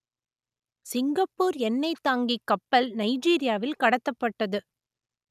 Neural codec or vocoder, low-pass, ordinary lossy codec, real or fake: none; 14.4 kHz; none; real